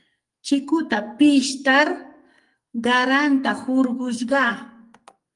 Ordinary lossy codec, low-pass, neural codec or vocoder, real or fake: Opus, 32 kbps; 10.8 kHz; codec, 44.1 kHz, 2.6 kbps, SNAC; fake